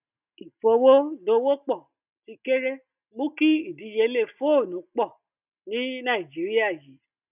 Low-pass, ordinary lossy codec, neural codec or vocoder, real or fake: 3.6 kHz; none; none; real